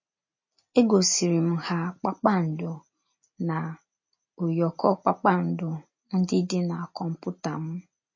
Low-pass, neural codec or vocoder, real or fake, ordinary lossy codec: 7.2 kHz; none; real; MP3, 32 kbps